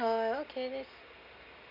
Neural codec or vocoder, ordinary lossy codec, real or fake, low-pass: none; none; real; 5.4 kHz